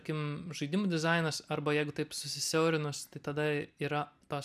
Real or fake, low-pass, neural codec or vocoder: real; 14.4 kHz; none